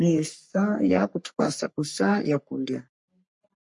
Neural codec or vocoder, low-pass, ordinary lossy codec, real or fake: codec, 44.1 kHz, 3.4 kbps, Pupu-Codec; 10.8 kHz; MP3, 48 kbps; fake